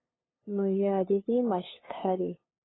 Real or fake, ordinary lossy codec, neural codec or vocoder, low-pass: fake; AAC, 16 kbps; codec, 16 kHz, 2 kbps, FunCodec, trained on LibriTTS, 25 frames a second; 7.2 kHz